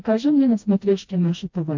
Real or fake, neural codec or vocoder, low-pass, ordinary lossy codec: fake; codec, 16 kHz, 1 kbps, FreqCodec, smaller model; 7.2 kHz; MP3, 48 kbps